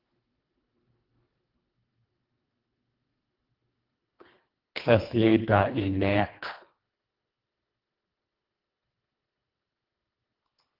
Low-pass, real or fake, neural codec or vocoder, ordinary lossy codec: 5.4 kHz; fake; codec, 24 kHz, 1.5 kbps, HILCodec; Opus, 24 kbps